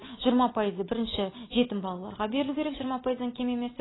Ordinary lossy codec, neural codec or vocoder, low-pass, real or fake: AAC, 16 kbps; none; 7.2 kHz; real